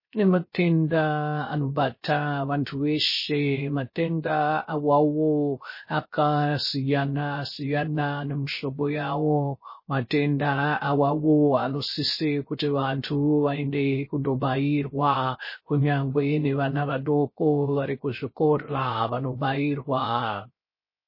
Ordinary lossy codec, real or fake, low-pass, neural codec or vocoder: MP3, 24 kbps; fake; 5.4 kHz; codec, 16 kHz, 0.3 kbps, FocalCodec